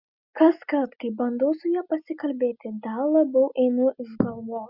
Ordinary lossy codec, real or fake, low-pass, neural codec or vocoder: Opus, 64 kbps; real; 5.4 kHz; none